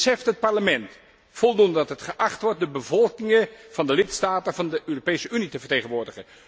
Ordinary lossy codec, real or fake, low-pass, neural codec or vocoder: none; real; none; none